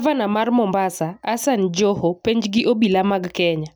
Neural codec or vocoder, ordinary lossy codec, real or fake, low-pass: none; none; real; none